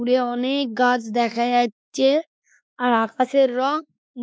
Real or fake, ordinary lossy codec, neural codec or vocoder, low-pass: fake; none; codec, 16 kHz, 2 kbps, X-Codec, WavLM features, trained on Multilingual LibriSpeech; none